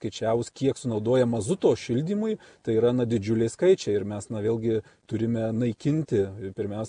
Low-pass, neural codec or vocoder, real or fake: 9.9 kHz; none; real